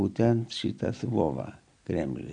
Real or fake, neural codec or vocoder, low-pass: real; none; 9.9 kHz